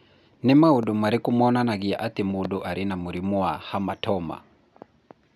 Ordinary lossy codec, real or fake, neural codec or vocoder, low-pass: none; real; none; 14.4 kHz